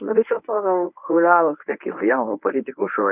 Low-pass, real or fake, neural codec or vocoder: 3.6 kHz; fake; codec, 24 kHz, 0.9 kbps, WavTokenizer, medium speech release version 1